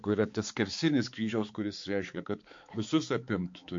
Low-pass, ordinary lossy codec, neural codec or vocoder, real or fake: 7.2 kHz; MP3, 48 kbps; codec, 16 kHz, 4 kbps, X-Codec, HuBERT features, trained on balanced general audio; fake